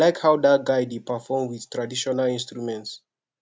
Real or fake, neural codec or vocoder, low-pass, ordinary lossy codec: real; none; none; none